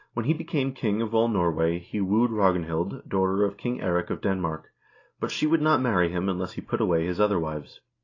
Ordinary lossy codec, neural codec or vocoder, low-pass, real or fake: AAC, 32 kbps; none; 7.2 kHz; real